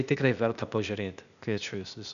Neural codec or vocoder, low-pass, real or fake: codec, 16 kHz, 0.8 kbps, ZipCodec; 7.2 kHz; fake